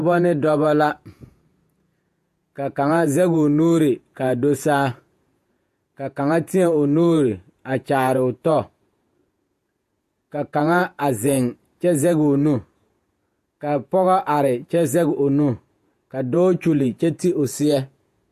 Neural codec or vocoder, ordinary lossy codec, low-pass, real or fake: vocoder, 48 kHz, 128 mel bands, Vocos; AAC, 96 kbps; 14.4 kHz; fake